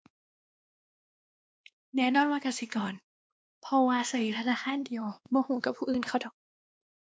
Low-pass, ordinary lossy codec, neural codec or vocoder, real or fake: none; none; codec, 16 kHz, 2 kbps, X-Codec, WavLM features, trained on Multilingual LibriSpeech; fake